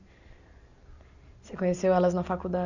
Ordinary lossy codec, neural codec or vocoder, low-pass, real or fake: none; none; 7.2 kHz; real